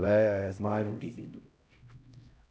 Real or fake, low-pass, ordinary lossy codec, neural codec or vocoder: fake; none; none; codec, 16 kHz, 0.5 kbps, X-Codec, HuBERT features, trained on LibriSpeech